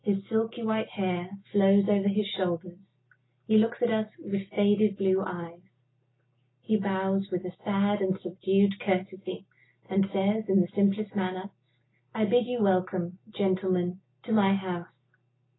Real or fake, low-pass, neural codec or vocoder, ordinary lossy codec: real; 7.2 kHz; none; AAC, 16 kbps